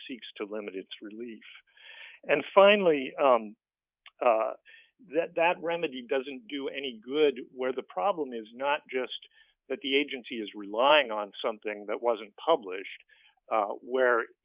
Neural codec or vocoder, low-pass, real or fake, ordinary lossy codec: codec, 24 kHz, 3.1 kbps, DualCodec; 3.6 kHz; fake; Opus, 64 kbps